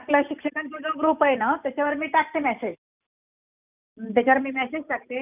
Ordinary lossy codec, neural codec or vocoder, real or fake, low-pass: none; none; real; 3.6 kHz